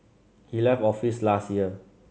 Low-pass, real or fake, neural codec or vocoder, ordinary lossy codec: none; real; none; none